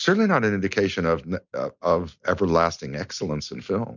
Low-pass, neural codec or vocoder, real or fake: 7.2 kHz; none; real